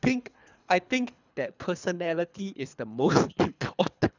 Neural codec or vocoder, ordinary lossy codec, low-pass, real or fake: codec, 24 kHz, 3 kbps, HILCodec; none; 7.2 kHz; fake